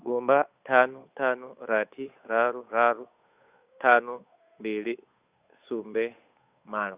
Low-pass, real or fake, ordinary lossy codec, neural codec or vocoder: 3.6 kHz; fake; Opus, 64 kbps; codec, 16 kHz, 8 kbps, FunCodec, trained on Chinese and English, 25 frames a second